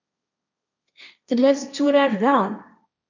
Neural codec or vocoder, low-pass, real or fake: codec, 16 kHz, 1.1 kbps, Voila-Tokenizer; 7.2 kHz; fake